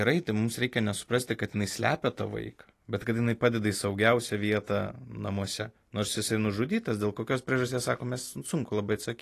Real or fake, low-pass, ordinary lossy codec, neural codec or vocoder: real; 14.4 kHz; AAC, 48 kbps; none